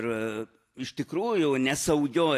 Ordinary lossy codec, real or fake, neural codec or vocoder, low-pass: AAC, 64 kbps; real; none; 14.4 kHz